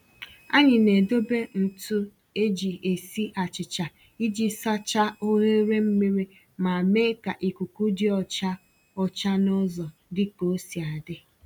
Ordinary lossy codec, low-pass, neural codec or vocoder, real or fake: none; 19.8 kHz; none; real